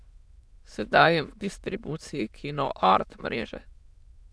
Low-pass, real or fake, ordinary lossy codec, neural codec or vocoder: none; fake; none; autoencoder, 22.05 kHz, a latent of 192 numbers a frame, VITS, trained on many speakers